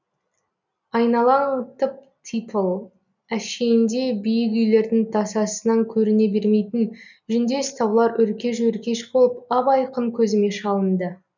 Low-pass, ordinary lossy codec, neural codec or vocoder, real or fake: 7.2 kHz; none; none; real